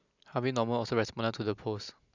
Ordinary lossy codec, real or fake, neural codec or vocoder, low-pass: none; real; none; 7.2 kHz